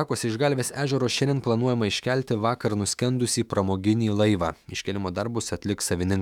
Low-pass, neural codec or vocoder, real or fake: 19.8 kHz; autoencoder, 48 kHz, 128 numbers a frame, DAC-VAE, trained on Japanese speech; fake